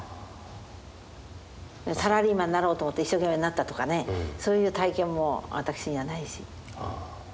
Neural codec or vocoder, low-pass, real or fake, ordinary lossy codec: none; none; real; none